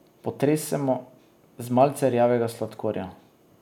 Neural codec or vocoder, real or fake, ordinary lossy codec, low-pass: none; real; none; 19.8 kHz